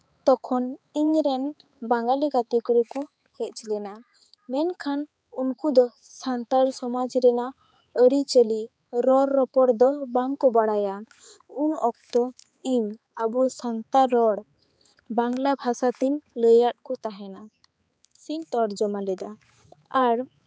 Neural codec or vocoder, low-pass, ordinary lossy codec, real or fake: codec, 16 kHz, 4 kbps, X-Codec, HuBERT features, trained on balanced general audio; none; none; fake